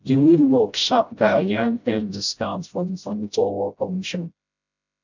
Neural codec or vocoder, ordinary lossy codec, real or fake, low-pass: codec, 16 kHz, 0.5 kbps, FreqCodec, smaller model; none; fake; 7.2 kHz